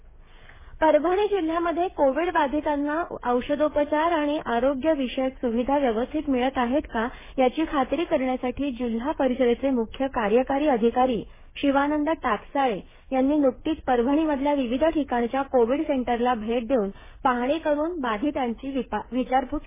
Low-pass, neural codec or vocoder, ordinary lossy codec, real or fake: 3.6 kHz; codec, 16 kHz, 8 kbps, FreqCodec, smaller model; MP3, 16 kbps; fake